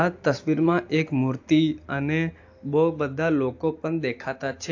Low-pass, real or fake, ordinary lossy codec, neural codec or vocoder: 7.2 kHz; real; AAC, 48 kbps; none